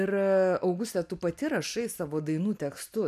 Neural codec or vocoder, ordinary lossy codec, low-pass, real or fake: none; MP3, 96 kbps; 14.4 kHz; real